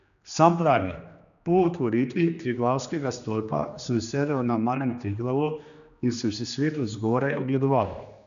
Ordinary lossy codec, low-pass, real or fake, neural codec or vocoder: none; 7.2 kHz; fake; codec, 16 kHz, 2 kbps, X-Codec, HuBERT features, trained on general audio